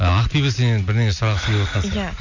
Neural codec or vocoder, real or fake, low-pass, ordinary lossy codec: none; real; 7.2 kHz; none